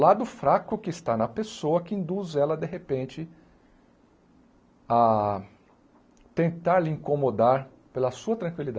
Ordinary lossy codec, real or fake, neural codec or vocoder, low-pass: none; real; none; none